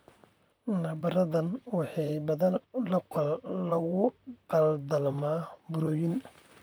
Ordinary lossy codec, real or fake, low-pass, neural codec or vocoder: none; fake; none; vocoder, 44.1 kHz, 128 mel bands, Pupu-Vocoder